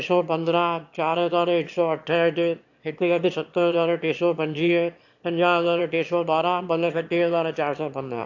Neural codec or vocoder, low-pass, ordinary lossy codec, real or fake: autoencoder, 22.05 kHz, a latent of 192 numbers a frame, VITS, trained on one speaker; 7.2 kHz; AAC, 48 kbps; fake